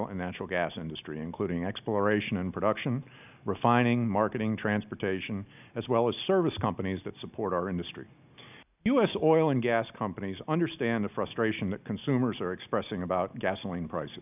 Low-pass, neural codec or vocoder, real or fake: 3.6 kHz; none; real